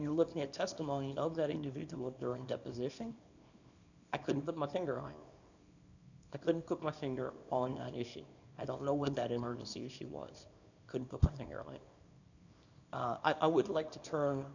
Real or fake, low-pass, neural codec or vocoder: fake; 7.2 kHz; codec, 24 kHz, 0.9 kbps, WavTokenizer, small release